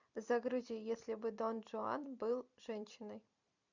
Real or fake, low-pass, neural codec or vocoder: fake; 7.2 kHz; vocoder, 44.1 kHz, 128 mel bands every 256 samples, BigVGAN v2